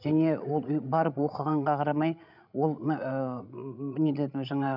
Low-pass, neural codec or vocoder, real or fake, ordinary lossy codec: 5.4 kHz; codec, 16 kHz, 16 kbps, FreqCodec, larger model; fake; none